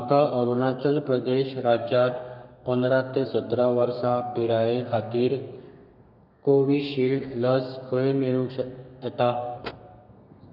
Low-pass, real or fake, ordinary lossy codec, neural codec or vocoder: 5.4 kHz; fake; none; codec, 32 kHz, 1.9 kbps, SNAC